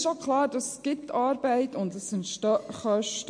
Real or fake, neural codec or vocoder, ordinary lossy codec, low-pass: real; none; MP3, 48 kbps; 9.9 kHz